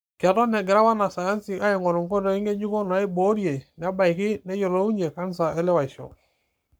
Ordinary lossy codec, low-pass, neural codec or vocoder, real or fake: none; none; codec, 44.1 kHz, 7.8 kbps, Pupu-Codec; fake